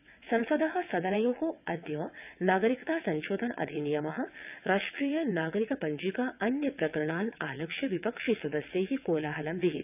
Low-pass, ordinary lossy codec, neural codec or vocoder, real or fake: 3.6 kHz; none; vocoder, 22.05 kHz, 80 mel bands, WaveNeXt; fake